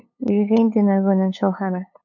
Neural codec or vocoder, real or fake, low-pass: codec, 16 kHz, 8 kbps, FunCodec, trained on LibriTTS, 25 frames a second; fake; 7.2 kHz